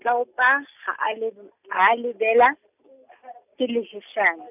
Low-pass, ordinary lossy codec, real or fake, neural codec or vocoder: 3.6 kHz; none; real; none